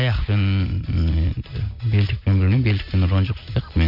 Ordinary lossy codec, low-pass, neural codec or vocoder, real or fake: none; 5.4 kHz; none; real